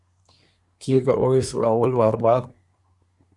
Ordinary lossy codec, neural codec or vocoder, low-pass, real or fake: Opus, 64 kbps; codec, 24 kHz, 1 kbps, SNAC; 10.8 kHz; fake